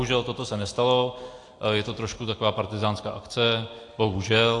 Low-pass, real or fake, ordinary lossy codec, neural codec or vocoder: 10.8 kHz; real; AAC, 48 kbps; none